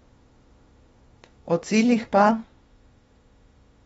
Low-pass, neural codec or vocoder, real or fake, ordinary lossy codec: 19.8 kHz; autoencoder, 48 kHz, 32 numbers a frame, DAC-VAE, trained on Japanese speech; fake; AAC, 24 kbps